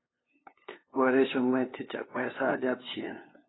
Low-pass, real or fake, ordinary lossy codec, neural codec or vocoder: 7.2 kHz; fake; AAC, 16 kbps; codec, 16 kHz, 2 kbps, FunCodec, trained on LibriTTS, 25 frames a second